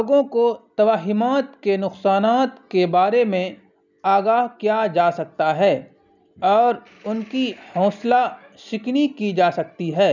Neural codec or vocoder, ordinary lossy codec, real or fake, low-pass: none; none; real; 7.2 kHz